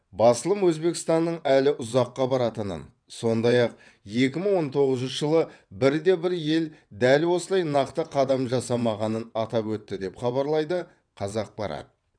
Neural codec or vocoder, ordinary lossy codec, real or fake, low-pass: vocoder, 22.05 kHz, 80 mel bands, WaveNeXt; none; fake; 9.9 kHz